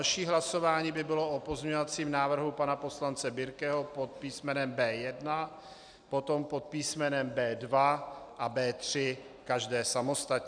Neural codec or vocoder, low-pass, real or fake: none; 9.9 kHz; real